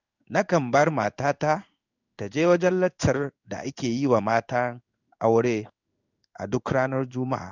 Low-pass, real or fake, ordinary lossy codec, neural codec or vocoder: 7.2 kHz; fake; none; codec, 16 kHz in and 24 kHz out, 1 kbps, XY-Tokenizer